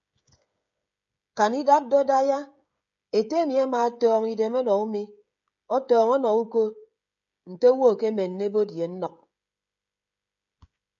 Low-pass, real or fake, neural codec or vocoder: 7.2 kHz; fake; codec, 16 kHz, 16 kbps, FreqCodec, smaller model